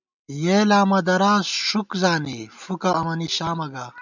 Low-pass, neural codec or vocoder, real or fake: 7.2 kHz; none; real